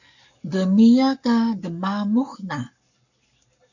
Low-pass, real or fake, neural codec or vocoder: 7.2 kHz; fake; codec, 44.1 kHz, 7.8 kbps, Pupu-Codec